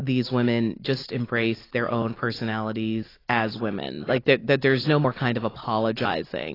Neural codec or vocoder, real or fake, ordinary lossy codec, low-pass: none; real; AAC, 24 kbps; 5.4 kHz